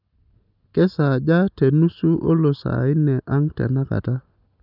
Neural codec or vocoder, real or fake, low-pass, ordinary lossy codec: none; real; 5.4 kHz; none